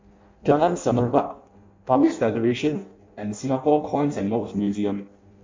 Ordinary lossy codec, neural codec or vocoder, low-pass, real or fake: MP3, 64 kbps; codec, 16 kHz in and 24 kHz out, 0.6 kbps, FireRedTTS-2 codec; 7.2 kHz; fake